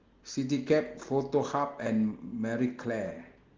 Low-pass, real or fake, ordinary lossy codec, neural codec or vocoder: 7.2 kHz; real; Opus, 16 kbps; none